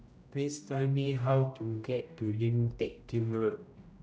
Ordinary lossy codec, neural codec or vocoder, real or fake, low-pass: none; codec, 16 kHz, 0.5 kbps, X-Codec, HuBERT features, trained on general audio; fake; none